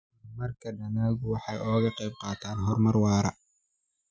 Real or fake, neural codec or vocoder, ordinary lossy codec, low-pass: real; none; none; none